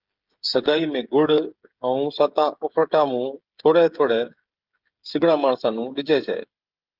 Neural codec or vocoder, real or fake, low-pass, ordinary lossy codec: codec, 16 kHz, 16 kbps, FreqCodec, smaller model; fake; 5.4 kHz; Opus, 24 kbps